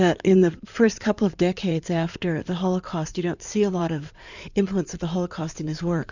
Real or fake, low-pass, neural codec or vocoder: fake; 7.2 kHz; codec, 16 kHz in and 24 kHz out, 2.2 kbps, FireRedTTS-2 codec